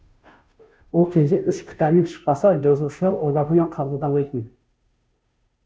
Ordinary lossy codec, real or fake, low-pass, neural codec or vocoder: none; fake; none; codec, 16 kHz, 0.5 kbps, FunCodec, trained on Chinese and English, 25 frames a second